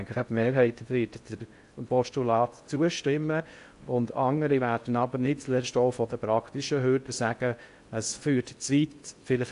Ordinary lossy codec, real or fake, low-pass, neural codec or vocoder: AAC, 64 kbps; fake; 10.8 kHz; codec, 16 kHz in and 24 kHz out, 0.6 kbps, FocalCodec, streaming, 4096 codes